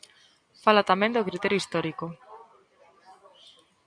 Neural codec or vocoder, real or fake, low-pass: none; real; 9.9 kHz